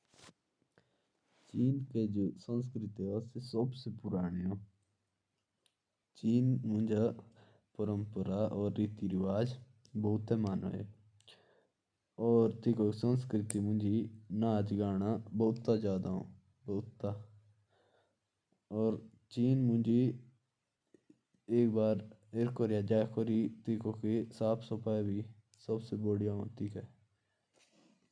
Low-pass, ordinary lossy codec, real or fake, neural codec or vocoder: 9.9 kHz; none; real; none